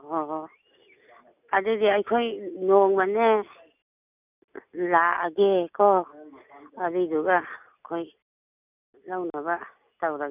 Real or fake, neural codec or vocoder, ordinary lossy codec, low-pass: real; none; none; 3.6 kHz